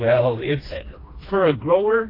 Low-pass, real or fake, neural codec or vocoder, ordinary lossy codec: 5.4 kHz; fake; codec, 16 kHz, 2 kbps, FreqCodec, smaller model; AAC, 24 kbps